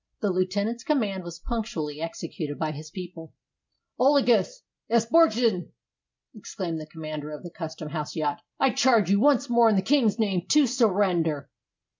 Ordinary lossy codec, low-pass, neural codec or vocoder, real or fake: MP3, 64 kbps; 7.2 kHz; none; real